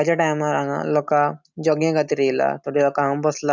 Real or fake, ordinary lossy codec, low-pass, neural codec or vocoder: real; none; none; none